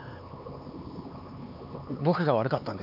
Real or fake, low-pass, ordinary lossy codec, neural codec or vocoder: fake; 5.4 kHz; none; codec, 16 kHz, 4 kbps, X-Codec, HuBERT features, trained on LibriSpeech